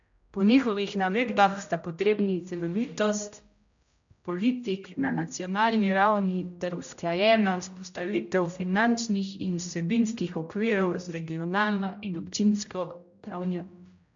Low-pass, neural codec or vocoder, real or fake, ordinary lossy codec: 7.2 kHz; codec, 16 kHz, 0.5 kbps, X-Codec, HuBERT features, trained on general audio; fake; MP3, 64 kbps